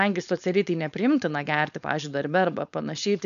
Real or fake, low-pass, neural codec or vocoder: fake; 7.2 kHz; codec, 16 kHz, 4.8 kbps, FACodec